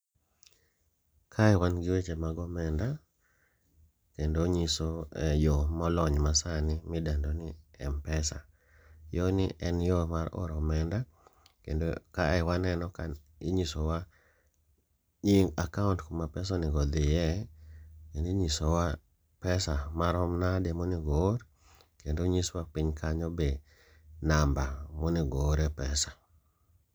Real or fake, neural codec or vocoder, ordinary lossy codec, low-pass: real; none; none; none